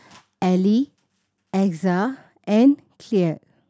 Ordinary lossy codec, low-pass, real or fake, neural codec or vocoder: none; none; real; none